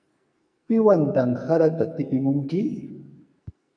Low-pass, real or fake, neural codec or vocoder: 9.9 kHz; fake; codec, 44.1 kHz, 2.6 kbps, SNAC